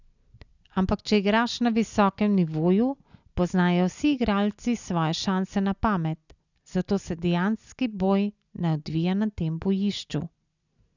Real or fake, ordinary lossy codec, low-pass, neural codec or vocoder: real; none; 7.2 kHz; none